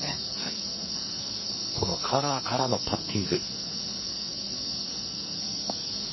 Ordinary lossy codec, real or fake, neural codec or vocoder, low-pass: MP3, 24 kbps; fake; codec, 44.1 kHz, 2.6 kbps, SNAC; 7.2 kHz